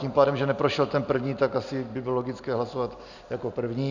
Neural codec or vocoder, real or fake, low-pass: none; real; 7.2 kHz